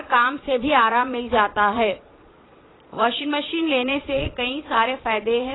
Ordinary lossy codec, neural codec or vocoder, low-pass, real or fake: AAC, 16 kbps; vocoder, 22.05 kHz, 80 mel bands, Vocos; 7.2 kHz; fake